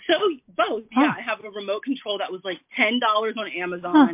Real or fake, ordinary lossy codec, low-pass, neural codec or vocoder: real; MP3, 32 kbps; 3.6 kHz; none